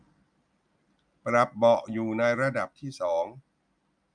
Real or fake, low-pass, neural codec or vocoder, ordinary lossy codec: real; 9.9 kHz; none; none